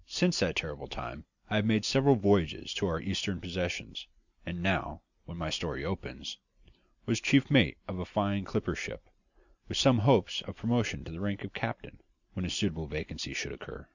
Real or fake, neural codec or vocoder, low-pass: real; none; 7.2 kHz